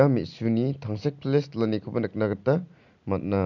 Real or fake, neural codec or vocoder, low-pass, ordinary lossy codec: real; none; 7.2 kHz; none